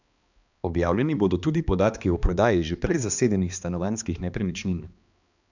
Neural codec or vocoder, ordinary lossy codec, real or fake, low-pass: codec, 16 kHz, 2 kbps, X-Codec, HuBERT features, trained on balanced general audio; none; fake; 7.2 kHz